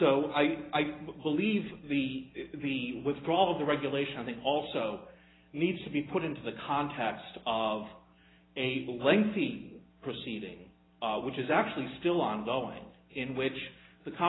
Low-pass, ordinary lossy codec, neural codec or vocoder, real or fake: 7.2 kHz; AAC, 16 kbps; none; real